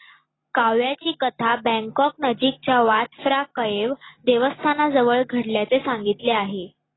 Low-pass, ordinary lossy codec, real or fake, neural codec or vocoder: 7.2 kHz; AAC, 16 kbps; real; none